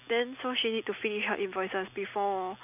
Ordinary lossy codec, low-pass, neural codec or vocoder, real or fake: none; 3.6 kHz; none; real